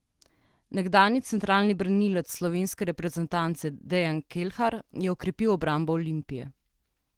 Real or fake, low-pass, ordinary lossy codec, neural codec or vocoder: fake; 19.8 kHz; Opus, 16 kbps; autoencoder, 48 kHz, 128 numbers a frame, DAC-VAE, trained on Japanese speech